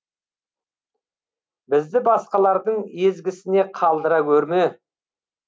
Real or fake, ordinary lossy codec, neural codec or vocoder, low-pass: real; none; none; none